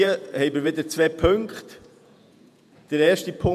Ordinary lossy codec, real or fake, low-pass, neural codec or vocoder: none; fake; 14.4 kHz; vocoder, 48 kHz, 128 mel bands, Vocos